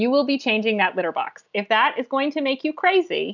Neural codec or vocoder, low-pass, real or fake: none; 7.2 kHz; real